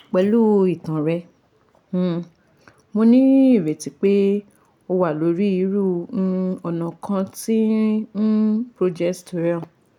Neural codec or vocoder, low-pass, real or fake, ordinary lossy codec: none; 19.8 kHz; real; none